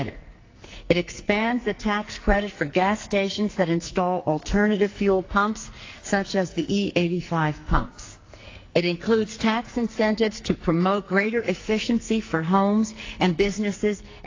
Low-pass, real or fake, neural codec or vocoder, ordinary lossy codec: 7.2 kHz; fake; codec, 44.1 kHz, 2.6 kbps, SNAC; AAC, 32 kbps